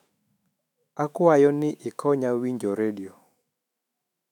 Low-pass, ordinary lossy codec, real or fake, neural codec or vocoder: 19.8 kHz; none; fake; autoencoder, 48 kHz, 128 numbers a frame, DAC-VAE, trained on Japanese speech